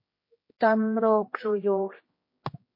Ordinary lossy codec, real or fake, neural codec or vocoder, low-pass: MP3, 24 kbps; fake; codec, 16 kHz, 1 kbps, X-Codec, HuBERT features, trained on general audio; 5.4 kHz